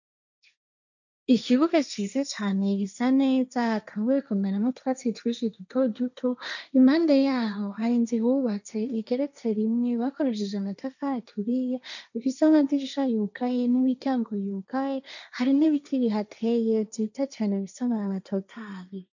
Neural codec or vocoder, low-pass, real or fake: codec, 16 kHz, 1.1 kbps, Voila-Tokenizer; 7.2 kHz; fake